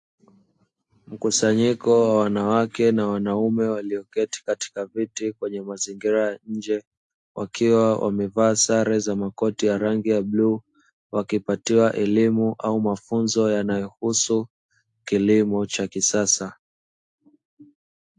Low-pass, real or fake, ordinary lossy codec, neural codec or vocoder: 10.8 kHz; real; AAC, 64 kbps; none